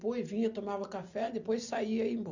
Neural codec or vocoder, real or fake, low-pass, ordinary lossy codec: none; real; 7.2 kHz; none